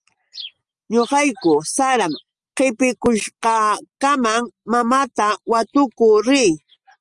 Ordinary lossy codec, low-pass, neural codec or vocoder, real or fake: Opus, 32 kbps; 10.8 kHz; none; real